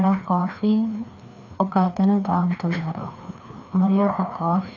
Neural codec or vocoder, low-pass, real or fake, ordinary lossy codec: codec, 16 kHz, 2 kbps, FreqCodec, larger model; 7.2 kHz; fake; none